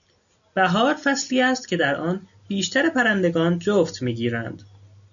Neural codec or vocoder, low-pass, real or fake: none; 7.2 kHz; real